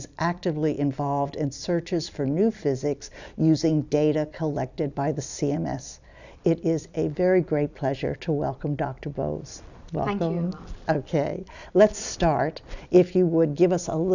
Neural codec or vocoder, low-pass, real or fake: vocoder, 44.1 kHz, 128 mel bands every 256 samples, BigVGAN v2; 7.2 kHz; fake